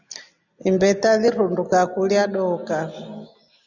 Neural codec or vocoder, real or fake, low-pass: none; real; 7.2 kHz